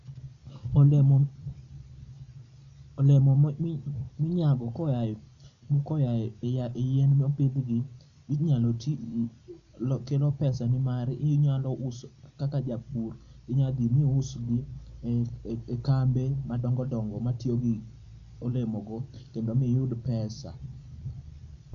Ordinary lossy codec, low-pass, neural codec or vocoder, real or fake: none; 7.2 kHz; none; real